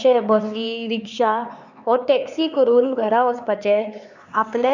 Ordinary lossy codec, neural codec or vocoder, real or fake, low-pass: none; codec, 16 kHz, 4 kbps, X-Codec, HuBERT features, trained on LibriSpeech; fake; 7.2 kHz